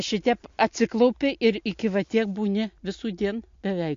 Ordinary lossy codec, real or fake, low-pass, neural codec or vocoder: MP3, 48 kbps; real; 7.2 kHz; none